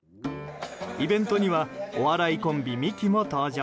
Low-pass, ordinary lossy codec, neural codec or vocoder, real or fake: none; none; none; real